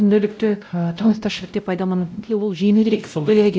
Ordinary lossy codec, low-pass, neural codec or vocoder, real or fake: none; none; codec, 16 kHz, 0.5 kbps, X-Codec, WavLM features, trained on Multilingual LibriSpeech; fake